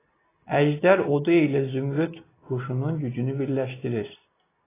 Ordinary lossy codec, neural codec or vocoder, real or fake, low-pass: AAC, 16 kbps; none; real; 3.6 kHz